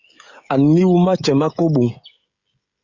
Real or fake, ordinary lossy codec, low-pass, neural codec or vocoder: fake; Opus, 64 kbps; 7.2 kHz; codec, 16 kHz, 16 kbps, FreqCodec, smaller model